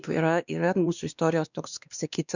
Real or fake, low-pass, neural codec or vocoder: fake; 7.2 kHz; codec, 16 kHz, 2 kbps, X-Codec, WavLM features, trained on Multilingual LibriSpeech